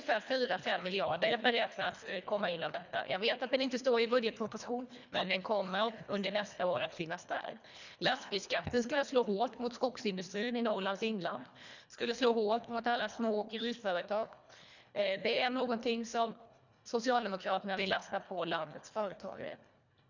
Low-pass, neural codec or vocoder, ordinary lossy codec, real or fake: 7.2 kHz; codec, 24 kHz, 1.5 kbps, HILCodec; none; fake